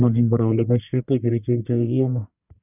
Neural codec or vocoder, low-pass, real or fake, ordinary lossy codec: codec, 44.1 kHz, 1.7 kbps, Pupu-Codec; 3.6 kHz; fake; none